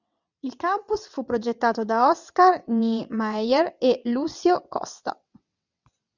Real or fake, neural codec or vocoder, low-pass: fake; vocoder, 22.05 kHz, 80 mel bands, WaveNeXt; 7.2 kHz